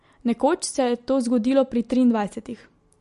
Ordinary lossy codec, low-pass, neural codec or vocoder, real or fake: MP3, 48 kbps; 14.4 kHz; none; real